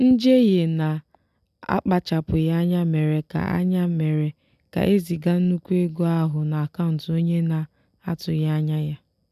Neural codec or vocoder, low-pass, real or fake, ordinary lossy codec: none; 14.4 kHz; real; none